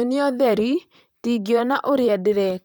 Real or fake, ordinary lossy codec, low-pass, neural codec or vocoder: fake; none; none; vocoder, 44.1 kHz, 128 mel bands, Pupu-Vocoder